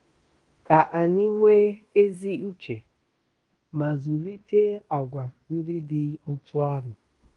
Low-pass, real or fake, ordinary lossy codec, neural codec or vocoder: 10.8 kHz; fake; Opus, 32 kbps; codec, 16 kHz in and 24 kHz out, 0.9 kbps, LongCat-Audio-Codec, fine tuned four codebook decoder